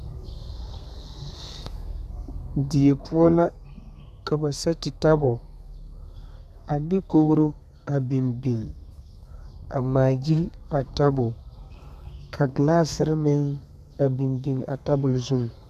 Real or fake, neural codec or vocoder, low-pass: fake; codec, 32 kHz, 1.9 kbps, SNAC; 14.4 kHz